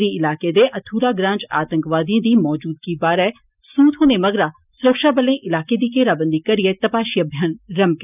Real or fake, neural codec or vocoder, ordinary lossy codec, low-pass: real; none; none; 3.6 kHz